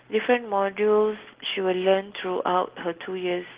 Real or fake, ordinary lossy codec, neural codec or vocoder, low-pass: real; Opus, 16 kbps; none; 3.6 kHz